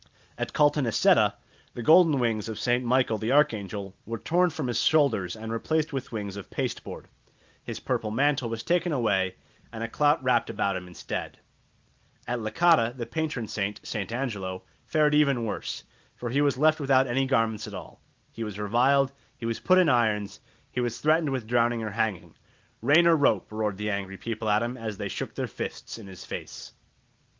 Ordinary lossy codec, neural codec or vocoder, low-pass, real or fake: Opus, 32 kbps; none; 7.2 kHz; real